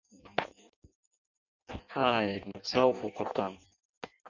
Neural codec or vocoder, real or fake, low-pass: codec, 16 kHz in and 24 kHz out, 0.6 kbps, FireRedTTS-2 codec; fake; 7.2 kHz